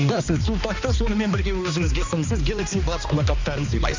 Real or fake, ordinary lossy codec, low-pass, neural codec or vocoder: fake; AAC, 48 kbps; 7.2 kHz; codec, 16 kHz, 2 kbps, X-Codec, HuBERT features, trained on balanced general audio